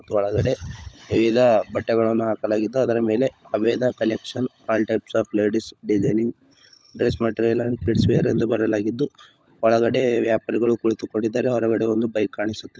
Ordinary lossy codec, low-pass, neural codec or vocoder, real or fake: none; none; codec, 16 kHz, 16 kbps, FunCodec, trained on LibriTTS, 50 frames a second; fake